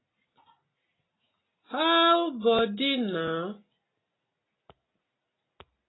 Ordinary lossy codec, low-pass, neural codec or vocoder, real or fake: AAC, 16 kbps; 7.2 kHz; none; real